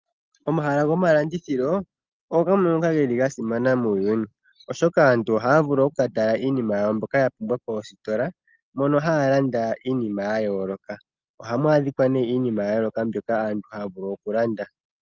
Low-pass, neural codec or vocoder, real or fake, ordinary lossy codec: 7.2 kHz; none; real; Opus, 24 kbps